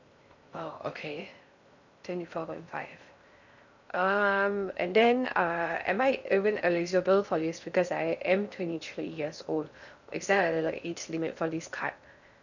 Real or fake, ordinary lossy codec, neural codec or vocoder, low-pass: fake; none; codec, 16 kHz in and 24 kHz out, 0.6 kbps, FocalCodec, streaming, 4096 codes; 7.2 kHz